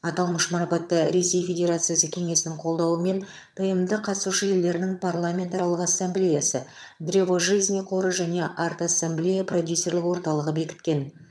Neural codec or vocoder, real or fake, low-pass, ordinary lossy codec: vocoder, 22.05 kHz, 80 mel bands, HiFi-GAN; fake; none; none